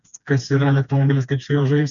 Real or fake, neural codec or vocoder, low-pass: fake; codec, 16 kHz, 2 kbps, FreqCodec, smaller model; 7.2 kHz